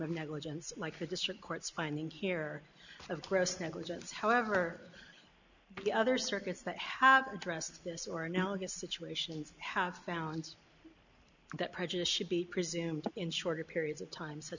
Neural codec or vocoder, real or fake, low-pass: none; real; 7.2 kHz